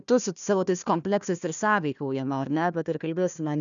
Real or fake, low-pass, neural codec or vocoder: fake; 7.2 kHz; codec, 16 kHz, 1 kbps, FunCodec, trained on Chinese and English, 50 frames a second